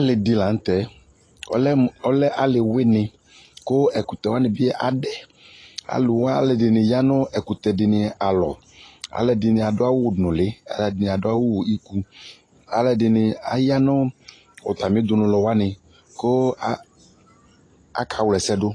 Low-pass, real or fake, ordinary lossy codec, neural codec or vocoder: 9.9 kHz; real; AAC, 32 kbps; none